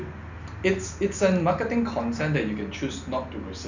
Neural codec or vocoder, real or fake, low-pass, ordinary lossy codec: none; real; 7.2 kHz; none